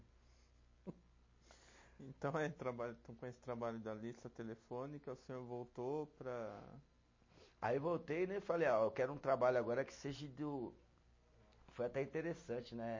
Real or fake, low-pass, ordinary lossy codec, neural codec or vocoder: real; 7.2 kHz; MP3, 32 kbps; none